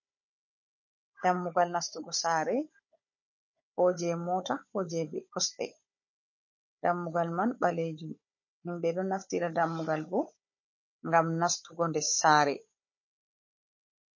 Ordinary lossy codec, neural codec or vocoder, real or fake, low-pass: MP3, 32 kbps; codec, 16 kHz, 16 kbps, FunCodec, trained on Chinese and English, 50 frames a second; fake; 7.2 kHz